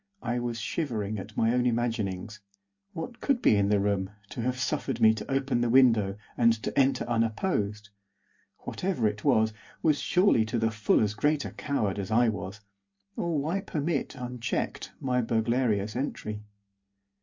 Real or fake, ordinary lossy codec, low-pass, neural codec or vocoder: real; MP3, 48 kbps; 7.2 kHz; none